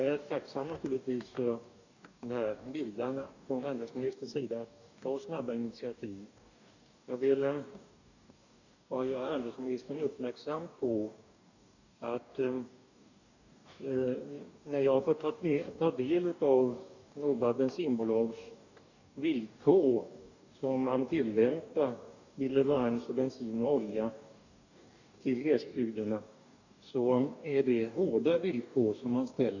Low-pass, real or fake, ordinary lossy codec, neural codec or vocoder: 7.2 kHz; fake; none; codec, 44.1 kHz, 2.6 kbps, DAC